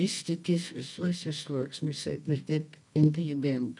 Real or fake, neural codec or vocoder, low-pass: fake; codec, 24 kHz, 0.9 kbps, WavTokenizer, medium music audio release; 10.8 kHz